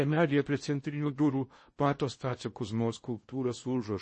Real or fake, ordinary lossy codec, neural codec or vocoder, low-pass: fake; MP3, 32 kbps; codec, 16 kHz in and 24 kHz out, 0.6 kbps, FocalCodec, streaming, 4096 codes; 10.8 kHz